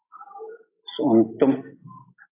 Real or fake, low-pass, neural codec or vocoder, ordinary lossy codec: real; 3.6 kHz; none; AAC, 24 kbps